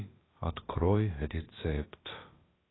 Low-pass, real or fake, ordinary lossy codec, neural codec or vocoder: 7.2 kHz; fake; AAC, 16 kbps; codec, 16 kHz, about 1 kbps, DyCAST, with the encoder's durations